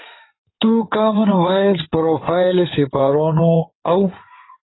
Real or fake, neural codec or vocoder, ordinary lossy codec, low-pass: fake; codec, 16 kHz in and 24 kHz out, 2.2 kbps, FireRedTTS-2 codec; AAC, 16 kbps; 7.2 kHz